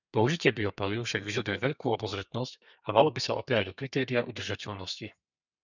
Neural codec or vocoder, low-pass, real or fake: codec, 32 kHz, 1.9 kbps, SNAC; 7.2 kHz; fake